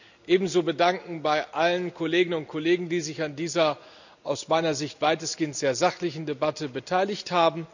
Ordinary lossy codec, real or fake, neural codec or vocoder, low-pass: none; real; none; 7.2 kHz